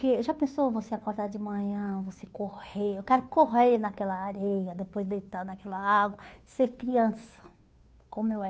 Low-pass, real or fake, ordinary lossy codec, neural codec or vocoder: none; fake; none; codec, 16 kHz, 2 kbps, FunCodec, trained on Chinese and English, 25 frames a second